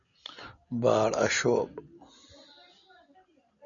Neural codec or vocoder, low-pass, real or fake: none; 7.2 kHz; real